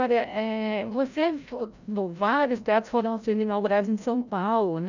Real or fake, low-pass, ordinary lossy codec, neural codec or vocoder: fake; 7.2 kHz; none; codec, 16 kHz, 0.5 kbps, FreqCodec, larger model